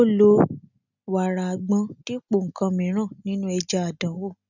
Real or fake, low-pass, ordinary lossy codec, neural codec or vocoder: real; 7.2 kHz; none; none